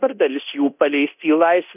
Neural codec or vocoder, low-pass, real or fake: codec, 24 kHz, 0.9 kbps, DualCodec; 3.6 kHz; fake